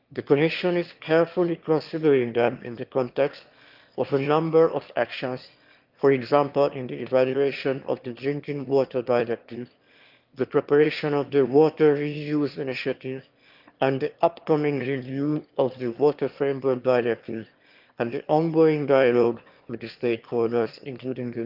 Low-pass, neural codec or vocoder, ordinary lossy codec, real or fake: 5.4 kHz; autoencoder, 22.05 kHz, a latent of 192 numbers a frame, VITS, trained on one speaker; Opus, 32 kbps; fake